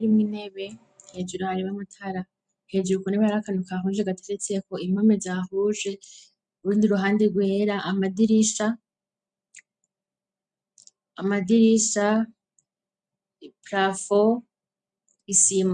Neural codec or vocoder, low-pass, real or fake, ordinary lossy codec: none; 9.9 kHz; real; MP3, 96 kbps